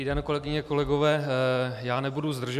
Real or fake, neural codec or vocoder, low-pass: real; none; 14.4 kHz